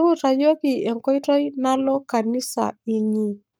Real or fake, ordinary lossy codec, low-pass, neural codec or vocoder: fake; none; none; codec, 44.1 kHz, 7.8 kbps, Pupu-Codec